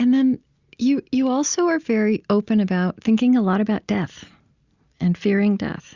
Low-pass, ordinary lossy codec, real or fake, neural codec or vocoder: 7.2 kHz; Opus, 64 kbps; real; none